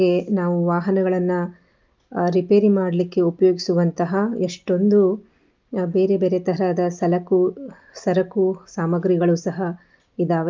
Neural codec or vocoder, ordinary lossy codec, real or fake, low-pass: none; Opus, 24 kbps; real; 7.2 kHz